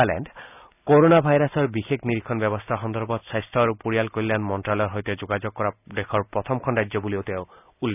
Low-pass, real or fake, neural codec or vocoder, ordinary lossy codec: 3.6 kHz; real; none; none